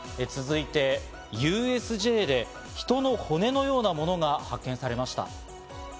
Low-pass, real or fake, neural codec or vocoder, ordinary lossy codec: none; real; none; none